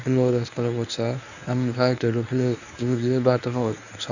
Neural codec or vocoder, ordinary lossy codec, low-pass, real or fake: codec, 24 kHz, 0.9 kbps, WavTokenizer, medium speech release version 1; none; 7.2 kHz; fake